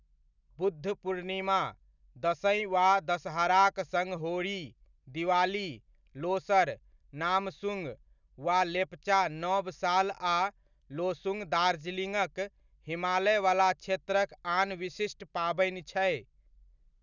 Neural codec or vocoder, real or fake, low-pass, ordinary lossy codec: none; real; 7.2 kHz; none